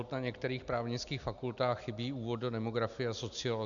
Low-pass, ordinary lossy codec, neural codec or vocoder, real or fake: 7.2 kHz; MP3, 96 kbps; none; real